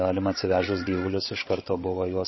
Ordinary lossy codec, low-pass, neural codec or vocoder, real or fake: MP3, 24 kbps; 7.2 kHz; codec, 16 kHz, 16 kbps, FreqCodec, larger model; fake